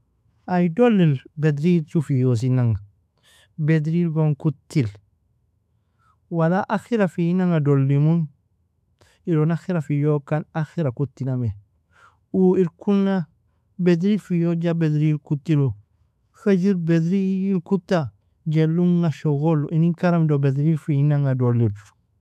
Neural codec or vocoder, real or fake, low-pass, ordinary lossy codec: autoencoder, 48 kHz, 128 numbers a frame, DAC-VAE, trained on Japanese speech; fake; 14.4 kHz; AAC, 96 kbps